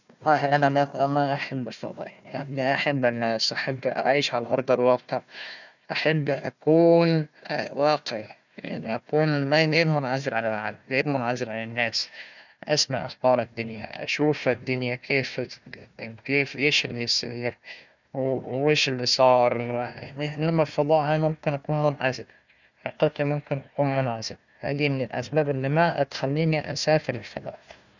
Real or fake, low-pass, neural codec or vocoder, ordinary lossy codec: fake; 7.2 kHz; codec, 16 kHz, 1 kbps, FunCodec, trained on Chinese and English, 50 frames a second; none